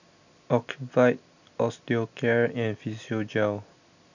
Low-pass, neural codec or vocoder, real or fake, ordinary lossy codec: 7.2 kHz; none; real; none